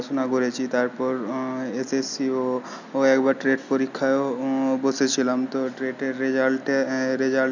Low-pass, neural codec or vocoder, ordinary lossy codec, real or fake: 7.2 kHz; none; none; real